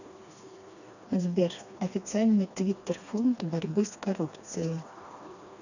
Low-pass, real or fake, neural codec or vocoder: 7.2 kHz; fake; codec, 16 kHz, 2 kbps, FreqCodec, smaller model